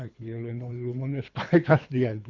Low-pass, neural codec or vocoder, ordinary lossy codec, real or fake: 7.2 kHz; codec, 24 kHz, 3 kbps, HILCodec; Opus, 64 kbps; fake